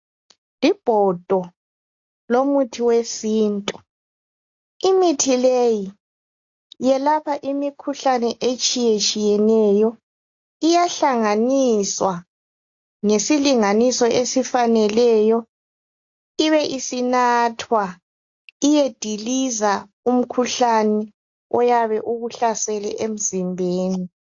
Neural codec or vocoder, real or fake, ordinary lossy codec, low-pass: none; real; AAC, 48 kbps; 7.2 kHz